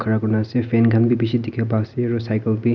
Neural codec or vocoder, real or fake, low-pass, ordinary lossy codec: none; real; 7.2 kHz; none